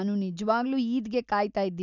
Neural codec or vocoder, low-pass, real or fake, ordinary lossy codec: none; 7.2 kHz; real; none